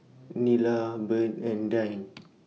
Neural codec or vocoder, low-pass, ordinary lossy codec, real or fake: none; none; none; real